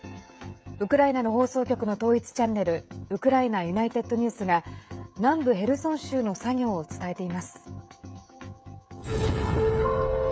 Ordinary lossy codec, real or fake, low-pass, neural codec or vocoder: none; fake; none; codec, 16 kHz, 16 kbps, FreqCodec, smaller model